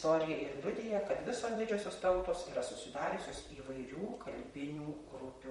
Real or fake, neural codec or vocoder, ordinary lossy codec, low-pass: fake; vocoder, 44.1 kHz, 128 mel bands, Pupu-Vocoder; MP3, 48 kbps; 19.8 kHz